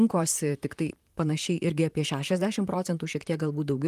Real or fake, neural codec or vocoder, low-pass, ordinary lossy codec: fake; vocoder, 44.1 kHz, 128 mel bands, Pupu-Vocoder; 14.4 kHz; Opus, 32 kbps